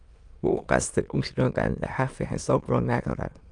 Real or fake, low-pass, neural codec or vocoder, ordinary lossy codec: fake; 9.9 kHz; autoencoder, 22.05 kHz, a latent of 192 numbers a frame, VITS, trained on many speakers; Opus, 32 kbps